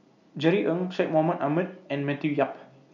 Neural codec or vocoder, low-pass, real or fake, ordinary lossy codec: none; 7.2 kHz; real; none